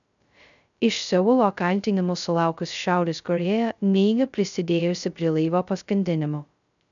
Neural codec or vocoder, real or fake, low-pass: codec, 16 kHz, 0.2 kbps, FocalCodec; fake; 7.2 kHz